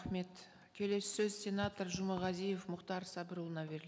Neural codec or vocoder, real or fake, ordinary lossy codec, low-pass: none; real; none; none